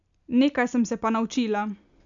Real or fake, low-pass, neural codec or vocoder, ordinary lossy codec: real; 7.2 kHz; none; none